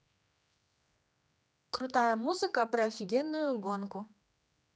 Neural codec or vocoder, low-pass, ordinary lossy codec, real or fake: codec, 16 kHz, 2 kbps, X-Codec, HuBERT features, trained on general audio; none; none; fake